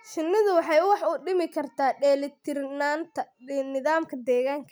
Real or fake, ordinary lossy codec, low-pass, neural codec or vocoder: real; none; none; none